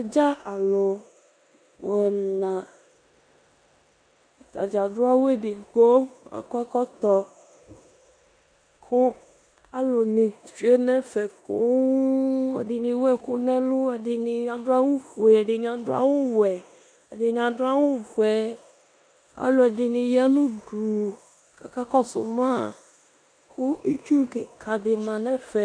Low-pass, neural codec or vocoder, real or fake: 9.9 kHz; codec, 16 kHz in and 24 kHz out, 0.9 kbps, LongCat-Audio-Codec, four codebook decoder; fake